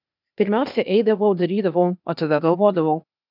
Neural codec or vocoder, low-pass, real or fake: codec, 16 kHz, 0.8 kbps, ZipCodec; 5.4 kHz; fake